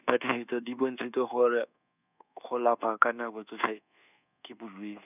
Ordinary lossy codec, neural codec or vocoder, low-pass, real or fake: none; codec, 24 kHz, 1.2 kbps, DualCodec; 3.6 kHz; fake